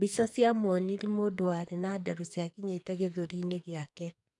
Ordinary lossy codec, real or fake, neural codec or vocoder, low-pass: none; fake; codec, 44.1 kHz, 2.6 kbps, SNAC; 10.8 kHz